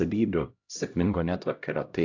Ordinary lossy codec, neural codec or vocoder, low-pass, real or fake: AAC, 48 kbps; codec, 16 kHz, 0.5 kbps, X-Codec, HuBERT features, trained on LibriSpeech; 7.2 kHz; fake